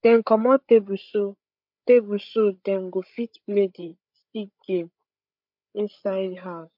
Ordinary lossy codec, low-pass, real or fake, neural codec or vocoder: MP3, 48 kbps; 5.4 kHz; fake; codec, 16 kHz, 8 kbps, FreqCodec, larger model